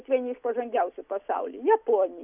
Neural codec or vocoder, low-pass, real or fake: none; 3.6 kHz; real